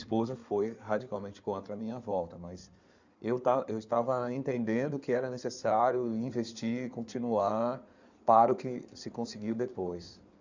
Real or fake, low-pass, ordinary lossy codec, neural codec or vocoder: fake; 7.2 kHz; Opus, 64 kbps; codec, 16 kHz in and 24 kHz out, 2.2 kbps, FireRedTTS-2 codec